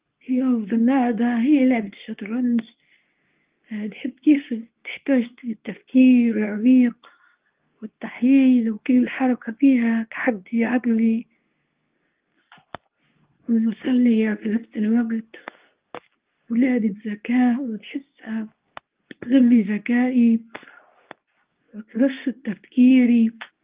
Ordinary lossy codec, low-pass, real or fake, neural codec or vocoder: Opus, 32 kbps; 3.6 kHz; fake; codec, 24 kHz, 0.9 kbps, WavTokenizer, medium speech release version 2